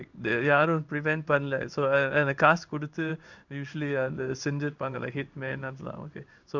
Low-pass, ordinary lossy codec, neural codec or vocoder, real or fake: 7.2 kHz; none; codec, 16 kHz in and 24 kHz out, 1 kbps, XY-Tokenizer; fake